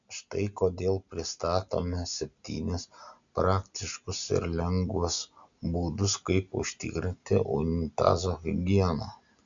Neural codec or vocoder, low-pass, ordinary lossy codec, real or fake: none; 7.2 kHz; MP3, 64 kbps; real